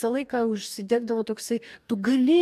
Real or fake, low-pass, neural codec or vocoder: fake; 14.4 kHz; codec, 44.1 kHz, 2.6 kbps, DAC